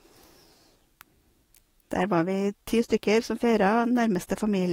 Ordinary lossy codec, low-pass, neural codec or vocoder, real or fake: AAC, 48 kbps; 19.8 kHz; codec, 44.1 kHz, 7.8 kbps, DAC; fake